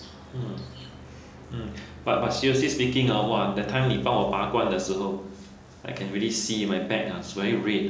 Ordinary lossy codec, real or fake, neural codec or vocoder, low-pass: none; real; none; none